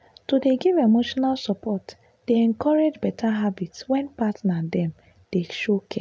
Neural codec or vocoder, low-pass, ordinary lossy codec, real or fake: none; none; none; real